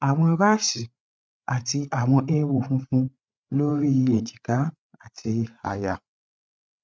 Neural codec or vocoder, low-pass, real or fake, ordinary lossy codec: codec, 16 kHz, 8 kbps, FreqCodec, larger model; none; fake; none